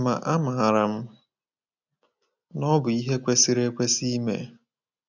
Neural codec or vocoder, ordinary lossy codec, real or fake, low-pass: none; none; real; 7.2 kHz